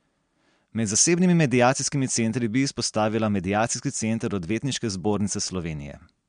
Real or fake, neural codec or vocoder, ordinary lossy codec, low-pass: real; none; MP3, 64 kbps; 9.9 kHz